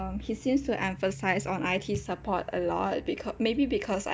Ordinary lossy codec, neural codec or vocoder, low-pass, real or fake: none; none; none; real